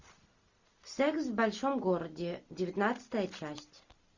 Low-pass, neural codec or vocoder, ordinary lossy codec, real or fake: 7.2 kHz; none; Opus, 64 kbps; real